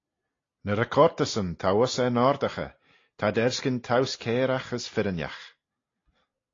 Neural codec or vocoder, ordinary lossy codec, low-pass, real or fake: none; AAC, 32 kbps; 7.2 kHz; real